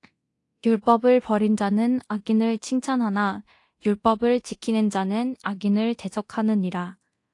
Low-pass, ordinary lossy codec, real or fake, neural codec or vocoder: 10.8 kHz; AAC, 48 kbps; fake; codec, 24 kHz, 0.9 kbps, DualCodec